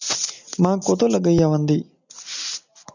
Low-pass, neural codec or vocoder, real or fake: 7.2 kHz; none; real